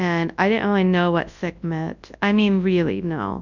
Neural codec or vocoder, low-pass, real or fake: codec, 24 kHz, 0.9 kbps, WavTokenizer, large speech release; 7.2 kHz; fake